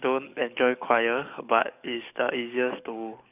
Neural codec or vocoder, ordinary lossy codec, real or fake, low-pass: codec, 44.1 kHz, 7.8 kbps, DAC; none; fake; 3.6 kHz